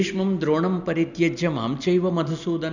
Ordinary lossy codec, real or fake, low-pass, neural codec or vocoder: none; real; 7.2 kHz; none